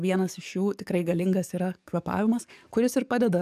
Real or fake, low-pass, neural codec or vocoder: fake; 14.4 kHz; codec, 44.1 kHz, 7.8 kbps, DAC